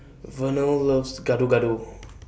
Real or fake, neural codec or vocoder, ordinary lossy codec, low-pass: real; none; none; none